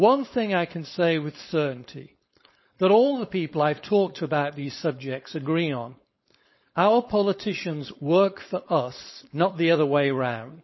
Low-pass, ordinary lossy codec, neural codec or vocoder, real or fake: 7.2 kHz; MP3, 24 kbps; codec, 16 kHz, 4.8 kbps, FACodec; fake